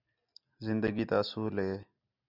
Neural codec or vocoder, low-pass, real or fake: none; 5.4 kHz; real